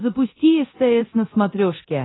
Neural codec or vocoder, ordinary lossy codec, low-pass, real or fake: codec, 24 kHz, 0.9 kbps, DualCodec; AAC, 16 kbps; 7.2 kHz; fake